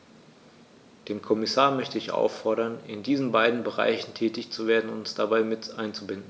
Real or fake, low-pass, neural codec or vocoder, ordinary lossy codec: real; none; none; none